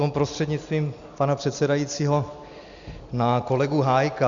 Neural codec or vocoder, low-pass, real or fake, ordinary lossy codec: none; 7.2 kHz; real; Opus, 64 kbps